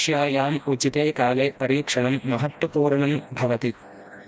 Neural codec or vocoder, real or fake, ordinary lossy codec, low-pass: codec, 16 kHz, 1 kbps, FreqCodec, smaller model; fake; none; none